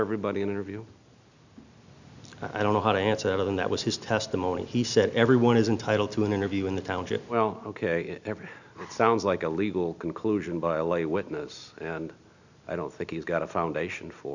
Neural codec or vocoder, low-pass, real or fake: none; 7.2 kHz; real